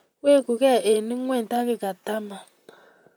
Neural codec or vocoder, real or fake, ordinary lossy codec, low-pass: vocoder, 44.1 kHz, 128 mel bands, Pupu-Vocoder; fake; none; none